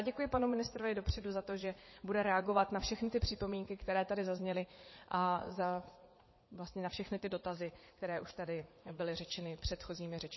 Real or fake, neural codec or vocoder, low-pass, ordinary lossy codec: fake; vocoder, 44.1 kHz, 80 mel bands, Vocos; 7.2 kHz; MP3, 24 kbps